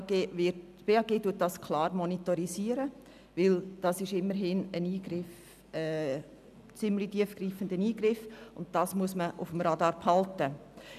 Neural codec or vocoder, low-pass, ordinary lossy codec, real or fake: none; 14.4 kHz; none; real